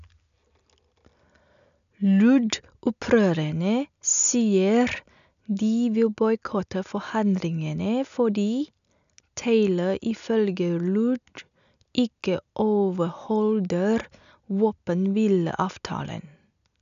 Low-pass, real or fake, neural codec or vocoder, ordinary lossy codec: 7.2 kHz; real; none; none